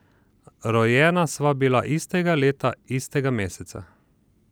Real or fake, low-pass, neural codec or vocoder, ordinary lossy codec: real; none; none; none